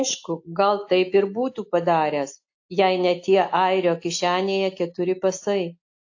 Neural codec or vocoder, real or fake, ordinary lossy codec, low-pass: none; real; AAC, 48 kbps; 7.2 kHz